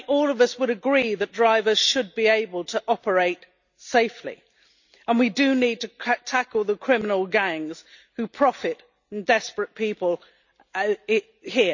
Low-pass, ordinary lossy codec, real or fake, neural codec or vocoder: 7.2 kHz; none; real; none